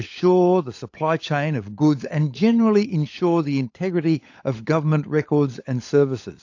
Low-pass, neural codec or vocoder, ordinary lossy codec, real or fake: 7.2 kHz; codec, 16 kHz, 16 kbps, FunCodec, trained on LibriTTS, 50 frames a second; AAC, 48 kbps; fake